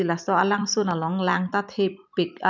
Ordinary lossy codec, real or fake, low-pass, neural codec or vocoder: none; fake; 7.2 kHz; vocoder, 44.1 kHz, 128 mel bands every 256 samples, BigVGAN v2